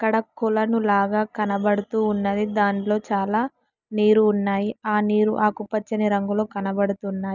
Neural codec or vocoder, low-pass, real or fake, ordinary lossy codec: none; 7.2 kHz; real; none